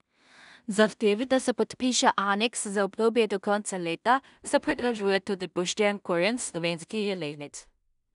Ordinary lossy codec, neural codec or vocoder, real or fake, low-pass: none; codec, 16 kHz in and 24 kHz out, 0.4 kbps, LongCat-Audio-Codec, two codebook decoder; fake; 10.8 kHz